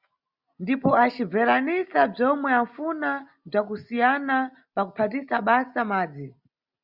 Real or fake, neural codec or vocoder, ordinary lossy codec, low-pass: real; none; Opus, 64 kbps; 5.4 kHz